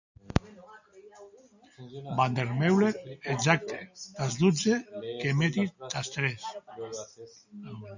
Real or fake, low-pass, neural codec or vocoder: real; 7.2 kHz; none